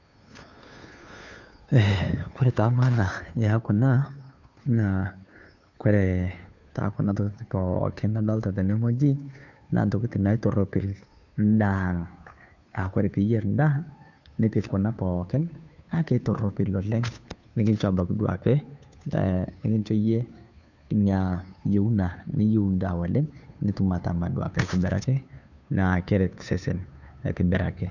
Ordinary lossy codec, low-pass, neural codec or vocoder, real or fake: none; 7.2 kHz; codec, 16 kHz, 2 kbps, FunCodec, trained on Chinese and English, 25 frames a second; fake